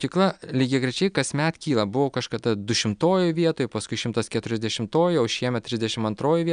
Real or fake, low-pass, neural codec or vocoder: real; 9.9 kHz; none